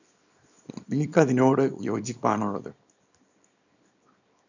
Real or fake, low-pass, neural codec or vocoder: fake; 7.2 kHz; codec, 24 kHz, 0.9 kbps, WavTokenizer, small release